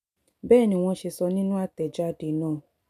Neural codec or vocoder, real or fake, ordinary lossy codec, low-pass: none; real; none; 14.4 kHz